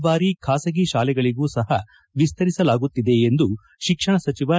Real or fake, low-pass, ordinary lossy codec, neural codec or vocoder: real; none; none; none